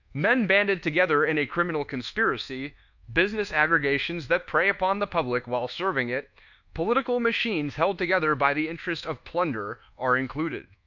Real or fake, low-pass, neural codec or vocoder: fake; 7.2 kHz; codec, 24 kHz, 1.2 kbps, DualCodec